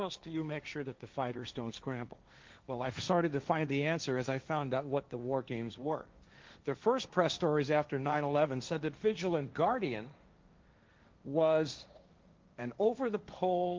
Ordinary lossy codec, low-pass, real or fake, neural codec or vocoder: Opus, 24 kbps; 7.2 kHz; fake; codec, 16 kHz, 1.1 kbps, Voila-Tokenizer